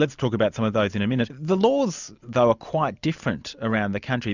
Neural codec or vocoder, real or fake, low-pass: none; real; 7.2 kHz